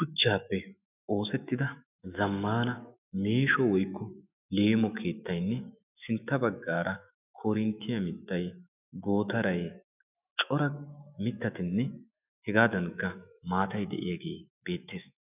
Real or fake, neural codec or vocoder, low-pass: fake; autoencoder, 48 kHz, 128 numbers a frame, DAC-VAE, trained on Japanese speech; 3.6 kHz